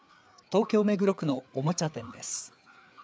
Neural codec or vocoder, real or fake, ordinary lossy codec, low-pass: codec, 16 kHz, 4 kbps, FreqCodec, larger model; fake; none; none